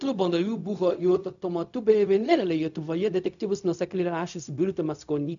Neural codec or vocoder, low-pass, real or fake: codec, 16 kHz, 0.4 kbps, LongCat-Audio-Codec; 7.2 kHz; fake